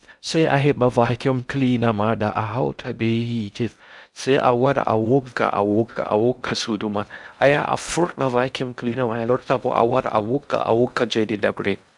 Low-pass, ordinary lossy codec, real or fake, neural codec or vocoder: 10.8 kHz; none; fake; codec, 16 kHz in and 24 kHz out, 0.6 kbps, FocalCodec, streaming, 4096 codes